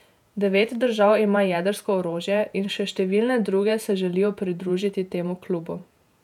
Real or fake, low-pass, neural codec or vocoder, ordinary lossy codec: fake; 19.8 kHz; vocoder, 48 kHz, 128 mel bands, Vocos; none